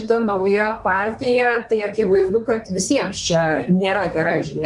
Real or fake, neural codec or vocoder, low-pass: fake; codec, 24 kHz, 1 kbps, SNAC; 10.8 kHz